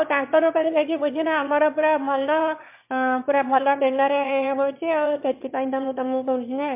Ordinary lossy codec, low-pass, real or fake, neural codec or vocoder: MP3, 32 kbps; 3.6 kHz; fake; autoencoder, 22.05 kHz, a latent of 192 numbers a frame, VITS, trained on one speaker